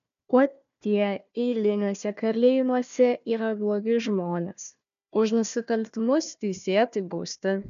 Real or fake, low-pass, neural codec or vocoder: fake; 7.2 kHz; codec, 16 kHz, 1 kbps, FunCodec, trained on Chinese and English, 50 frames a second